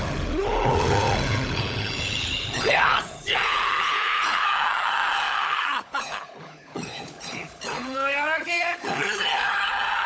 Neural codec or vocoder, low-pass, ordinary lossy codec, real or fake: codec, 16 kHz, 16 kbps, FunCodec, trained on LibriTTS, 50 frames a second; none; none; fake